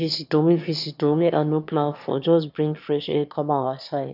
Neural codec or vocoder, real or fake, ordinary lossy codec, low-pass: autoencoder, 22.05 kHz, a latent of 192 numbers a frame, VITS, trained on one speaker; fake; none; 5.4 kHz